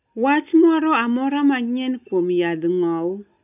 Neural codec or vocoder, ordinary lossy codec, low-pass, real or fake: none; none; 3.6 kHz; real